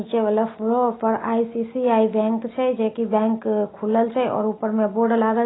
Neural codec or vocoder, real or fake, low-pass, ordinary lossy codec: none; real; 7.2 kHz; AAC, 16 kbps